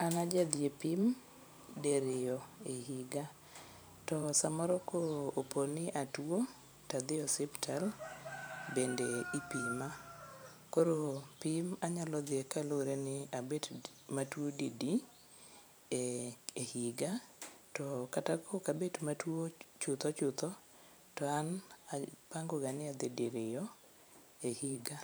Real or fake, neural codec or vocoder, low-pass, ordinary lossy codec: fake; vocoder, 44.1 kHz, 128 mel bands every 512 samples, BigVGAN v2; none; none